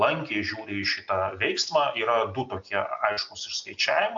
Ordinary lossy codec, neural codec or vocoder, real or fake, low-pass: AAC, 64 kbps; none; real; 7.2 kHz